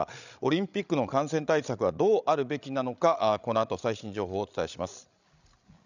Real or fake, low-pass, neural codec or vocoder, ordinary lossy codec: fake; 7.2 kHz; codec, 16 kHz, 16 kbps, FreqCodec, larger model; none